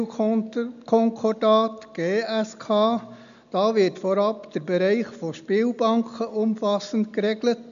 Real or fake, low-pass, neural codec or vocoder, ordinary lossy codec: real; 7.2 kHz; none; AAC, 96 kbps